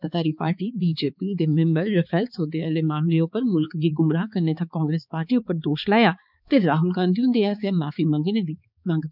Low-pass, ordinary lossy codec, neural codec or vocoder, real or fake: 5.4 kHz; none; codec, 16 kHz, 4 kbps, X-Codec, HuBERT features, trained on balanced general audio; fake